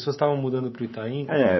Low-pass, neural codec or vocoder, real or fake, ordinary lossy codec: 7.2 kHz; codec, 24 kHz, 3.1 kbps, DualCodec; fake; MP3, 24 kbps